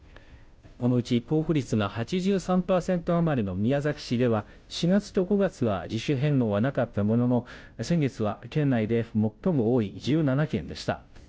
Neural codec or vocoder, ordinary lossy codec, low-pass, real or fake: codec, 16 kHz, 0.5 kbps, FunCodec, trained on Chinese and English, 25 frames a second; none; none; fake